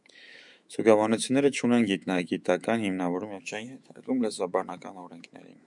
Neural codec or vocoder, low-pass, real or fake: autoencoder, 48 kHz, 128 numbers a frame, DAC-VAE, trained on Japanese speech; 10.8 kHz; fake